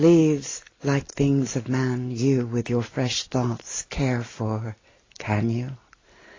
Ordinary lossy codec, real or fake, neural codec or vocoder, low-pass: AAC, 32 kbps; real; none; 7.2 kHz